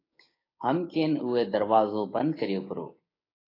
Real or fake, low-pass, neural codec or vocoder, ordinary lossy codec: fake; 5.4 kHz; codec, 16 kHz, 6 kbps, DAC; AAC, 24 kbps